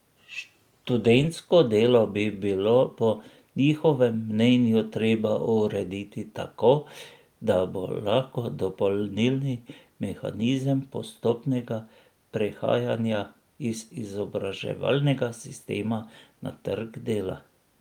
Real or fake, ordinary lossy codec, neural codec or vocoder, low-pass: real; Opus, 32 kbps; none; 19.8 kHz